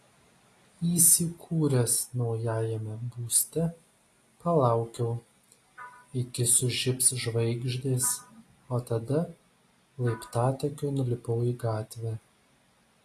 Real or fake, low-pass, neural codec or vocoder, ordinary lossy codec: real; 14.4 kHz; none; AAC, 48 kbps